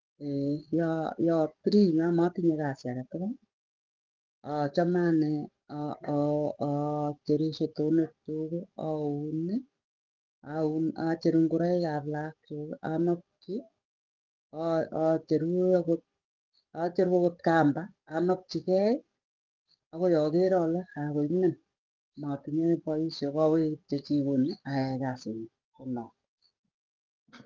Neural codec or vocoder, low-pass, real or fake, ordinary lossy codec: codec, 44.1 kHz, 7.8 kbps, DAC; 7.2 kHz; fake; Opus, 32 kbps